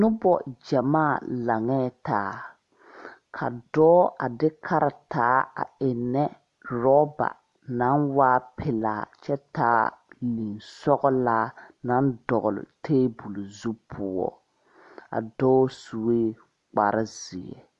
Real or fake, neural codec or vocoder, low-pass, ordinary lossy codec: real; none; 10.8 kHz; AAC, 64 kbps